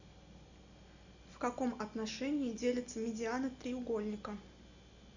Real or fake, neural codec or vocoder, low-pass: real; none; 7.2 kHz